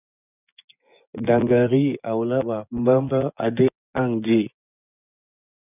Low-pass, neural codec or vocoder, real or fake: 3.6 kHz; vocoder, 24 kHz, 100 mel bands, Vocos; fake